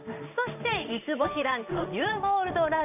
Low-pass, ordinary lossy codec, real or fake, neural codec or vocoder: 3.6 kHz; none; fake; codec, 16 kHz in and 24 kHz out, 1 kbps, XY-Tokenizer